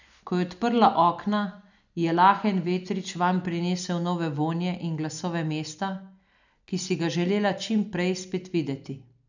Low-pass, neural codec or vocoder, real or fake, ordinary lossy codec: 7.2 kHz; none; real; none